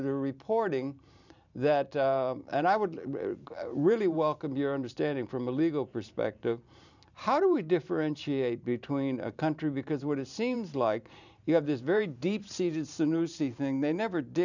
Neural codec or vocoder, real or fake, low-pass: vocoder, 44.1 kHz, 128 mel bands every 512 samples, BigVGAN v2; fake; 7.2 kHz